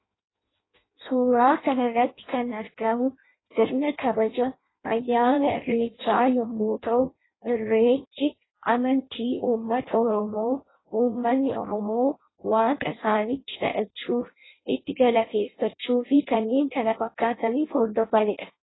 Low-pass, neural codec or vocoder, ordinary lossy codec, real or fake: 7.2 kHz; codec, 16 kHz in and 24 kHz out, 0.6 kbps, FireRedTTS-2 codec; AAC, 16 kbps; fake